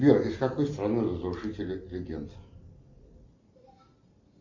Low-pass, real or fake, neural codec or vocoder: 7.2 kHz; real; none